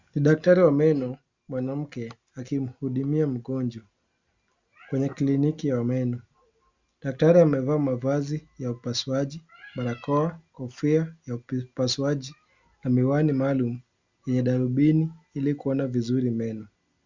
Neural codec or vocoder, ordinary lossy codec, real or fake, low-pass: none; Opus, 64 kbps; real; 7.2 kHz